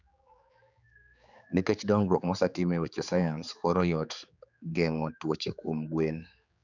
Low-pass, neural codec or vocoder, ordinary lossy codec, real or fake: 7.2 kHz; codec, 16 kHz, 4 kbps, X-Codec, HuBERT features, trained on general audio; none; fake